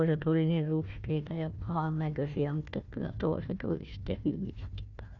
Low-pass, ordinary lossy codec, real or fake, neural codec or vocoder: 7.2 kHz; none; fake; codec, 16 kHz, 1 kbps, FunCodec, trained on Chinese and English, 50 frames a second